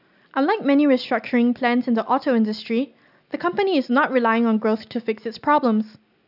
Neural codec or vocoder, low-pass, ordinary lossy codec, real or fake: none; 5.4 kHz; none; real